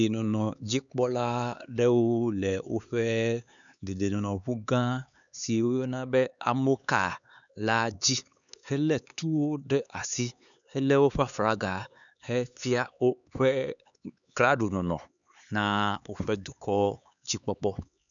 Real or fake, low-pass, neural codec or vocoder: fake; 7.2 kHz; codec, 16 kHz, 4 kbps, X-Codec, HuBERT features, trained on LibriSpeech